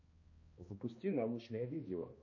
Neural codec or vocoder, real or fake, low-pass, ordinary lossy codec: codec, 16 kHz, 1 kbps, X-Codec, HuBERT features, trained on balanced general audio; fake; 7.2 kHz; MP3, 32 kbps